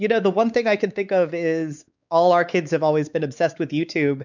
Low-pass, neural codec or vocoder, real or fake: 7.2 kHz; codec, 16 kHz, 4 kbps, X-Codec, WavLM features, trained on Multilingual LibriSpeech; fake